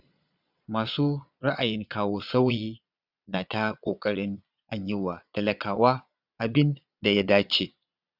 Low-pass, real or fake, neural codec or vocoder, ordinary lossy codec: 5.4 kHz; fake; vocoder, 22.05 kHz, 80 mel bands, Vocos; none